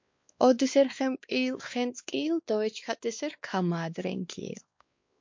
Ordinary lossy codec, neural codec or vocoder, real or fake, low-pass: MP3, 48 kbps; codec, 16 kHz, 2 kbps, X-Codec, WavLM features, trained on Multilingual LibriSpeech; fake; 7.2 kHz